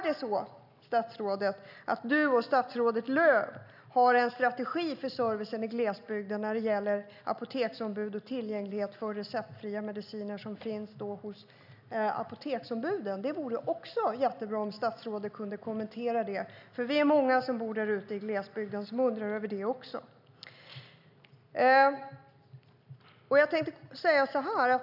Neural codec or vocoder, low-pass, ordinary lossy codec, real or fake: none; 5.4 kHz; none; real